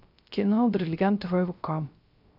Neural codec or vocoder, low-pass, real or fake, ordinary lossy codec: codec, 16 kHz, 0.3 kbps, FocalCodec; 5.4 kHz; fake; none